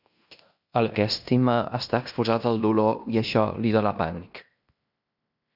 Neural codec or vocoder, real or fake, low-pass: codec, 16 kHz in and 24 kHz out, 0.9 kbps, LongCat-Audio-Codec, fine tuned four codebook decoder; fake; 5.4 kHz